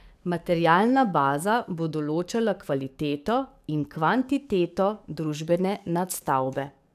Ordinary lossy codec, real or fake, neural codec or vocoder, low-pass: none; fake; codec, 44.1 kHz, 7.8 kbps, DAC; 14.4 kHz